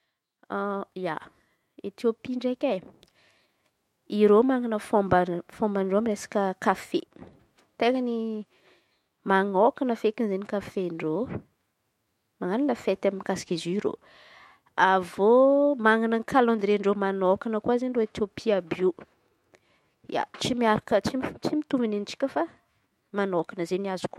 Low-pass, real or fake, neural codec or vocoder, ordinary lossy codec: 19.8 kHz; fake; autoencoder, 48 kHz, 128 numbers a frame, DAC-VAE, trained on Japanese speech; MP3, 64 kbps